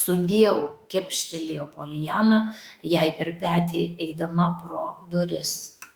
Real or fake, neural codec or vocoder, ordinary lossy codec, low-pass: fake; autoencoder, 48 kHz, 32 numbers a frame, DAC-VAE, trained on Japanese speech; Opus, 64 kbps; 19.8 kHz